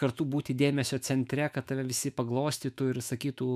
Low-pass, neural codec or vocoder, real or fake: 14.4 kHz; autoencoder, 48 kHz, 128 numbers a frame, DAC-VAE, trained on Japanese speech; fake